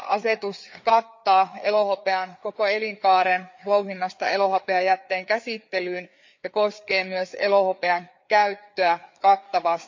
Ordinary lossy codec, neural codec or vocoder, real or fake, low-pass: MP3, 64 kbps; codec, 16 kHz, 4 kbps, FreqCodec, larger model; fake; 7.2 kHz